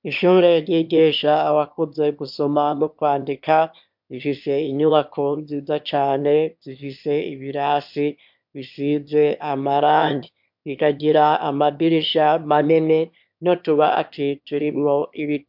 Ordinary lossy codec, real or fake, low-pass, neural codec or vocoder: MP3, 48 kbps; fake; 5.4 kHz; autoencoder, 22.05 kHz, a latent of 192 numbers a frame, VITS, trained on one speaker